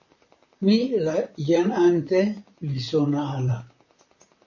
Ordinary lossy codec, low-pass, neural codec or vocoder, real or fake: MP3, 32 kbps; 7.2 kHz; vocoder, 44.1 kHz, 128 mel bands, Pupu-Vocoder; fake